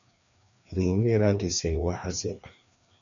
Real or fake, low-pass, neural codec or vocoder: fake; 7.2 kHz; codec, 16 kHz, 2 kbps, FreqCodec, larger model